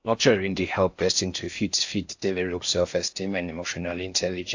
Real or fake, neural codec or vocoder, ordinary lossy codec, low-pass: fake; codec, 16 kHz in and 24 kHz out, 0.6 kbps, FocalCodec, streaming, 4096 codes; AAC, 48 kbps; 7.2 kHz